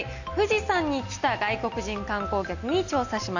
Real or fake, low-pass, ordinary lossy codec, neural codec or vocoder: real; 7.2 kHz; none; none